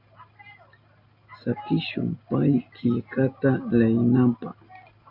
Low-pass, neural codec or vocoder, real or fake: 5.4 kHz; none; real